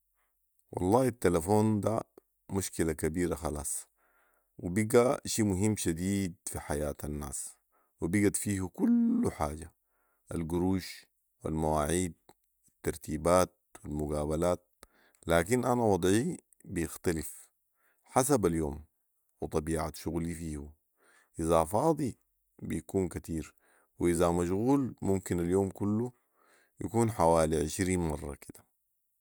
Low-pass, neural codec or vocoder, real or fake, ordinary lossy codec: none; none; real; none